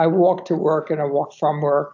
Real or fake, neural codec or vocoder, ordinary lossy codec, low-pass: real; none; AAC, 48 kbps; 7.2 kHz